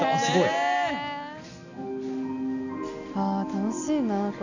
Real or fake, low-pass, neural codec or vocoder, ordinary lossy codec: real; 7.2 kHz; none; none